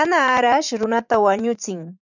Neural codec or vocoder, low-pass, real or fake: vocoder, 44.1 kHz, 80 mel bands, Vocos; 7.2 kHz; fake